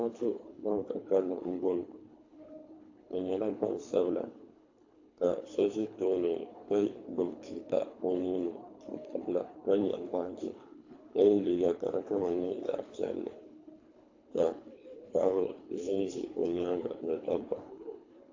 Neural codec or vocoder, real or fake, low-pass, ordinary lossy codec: codec, 24 kHz, 3 kbps, HILCodec; fake; 7.2 kHz; AAC, 48 kbps